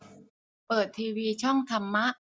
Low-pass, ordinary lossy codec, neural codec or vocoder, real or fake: none; none; none; real